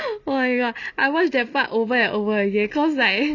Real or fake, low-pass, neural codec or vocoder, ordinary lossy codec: real; 7.2 kHz; none; none